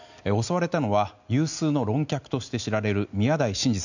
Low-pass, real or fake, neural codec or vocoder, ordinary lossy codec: 7.2 kHz; real; none; none